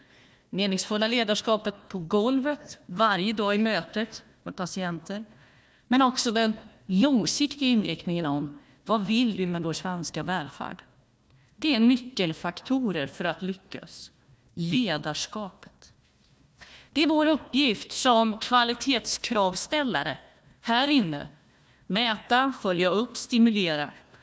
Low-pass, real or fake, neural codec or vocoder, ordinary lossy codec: none; fake; codec, 16 kHz, 1 kbps, FunCodec, trained on Chinese and English, 50 frames a second; none